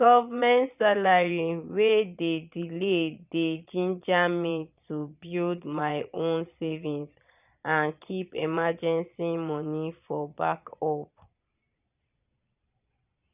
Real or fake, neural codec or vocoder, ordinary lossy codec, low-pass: fake; vocoder, 22.05 kHz, 80 mel bands, Vocos; none; 3.6 kHz